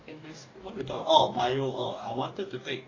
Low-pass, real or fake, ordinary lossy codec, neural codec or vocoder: 7.2 kHz; fake; AAC, 32 kbps; codec, 44.1 kHz, 2.6 kbps, DAC